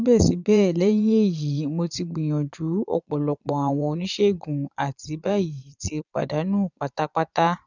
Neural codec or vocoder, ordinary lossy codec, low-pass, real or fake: vocoder, 22.05 kHz, 80 mel bands, Vocos; none; 7.2 kHz; fake